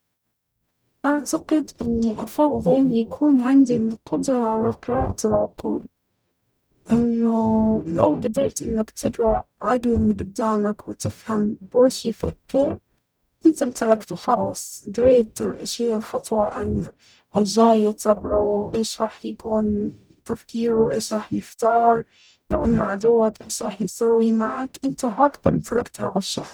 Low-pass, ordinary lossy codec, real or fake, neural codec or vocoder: none; none; fake; codec, 44.1 kHz, 0.9 kbps, DAC